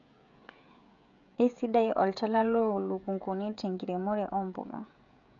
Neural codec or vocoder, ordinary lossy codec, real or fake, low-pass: codec, 16 kHz, 16 kbps, FreqCodec, smaller model; none; fake; 7.2 kHz